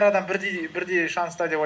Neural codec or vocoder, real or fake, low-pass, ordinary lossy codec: none; real; none; none